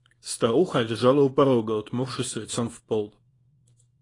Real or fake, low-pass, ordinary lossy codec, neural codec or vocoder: fake; 10.8 kHz; AAC, 32 kbps; codec, 24 kHz, 0.9 kbps, WavTokenizer, small release